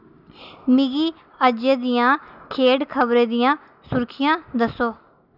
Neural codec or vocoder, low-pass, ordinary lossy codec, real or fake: none; 5.4 kHz; none; real